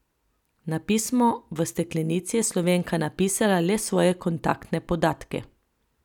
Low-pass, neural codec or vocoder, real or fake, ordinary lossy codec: 19.8 kHz; none; real; none